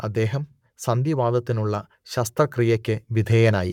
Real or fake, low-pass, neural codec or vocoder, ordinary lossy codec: fake; 19.8 kHz; codec, 44.1 kHz, 7.8 kbps, Pupu-Codec; none